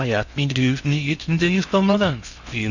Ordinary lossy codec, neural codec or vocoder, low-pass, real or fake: none; codec, 16 kHz in and 24 kHz out, 0.6 kbps, FocalCodec, streaming, 2048 codes; 7.2 kHz; fake